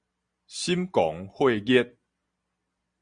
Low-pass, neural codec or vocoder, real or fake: 9.9 kHz; none; real